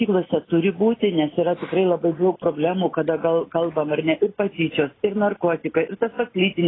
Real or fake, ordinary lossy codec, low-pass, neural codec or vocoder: real; AAC, 16 kbps; 7.2 kHz; none